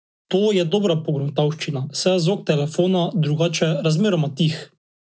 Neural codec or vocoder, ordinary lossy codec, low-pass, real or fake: none; none; none; real